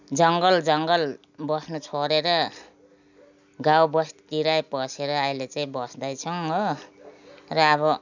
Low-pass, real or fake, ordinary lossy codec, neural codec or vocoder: 7.2 kHz; real; none; none